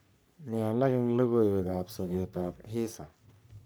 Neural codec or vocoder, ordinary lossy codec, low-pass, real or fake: codec, 44.1 kHz, 3.4 kbps, Pupu-Codec; none; none; fake